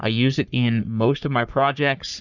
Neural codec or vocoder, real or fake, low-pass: codec, 44.1 kHz, 3.4 kbps, Pupu-Codec; fake; 7.2 kHz